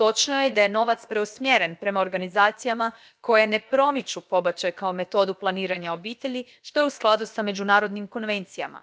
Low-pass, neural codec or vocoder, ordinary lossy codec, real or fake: none; codec, 16 kHz, about 1 kbps, DyCAST, with the encoder's durations; none; fake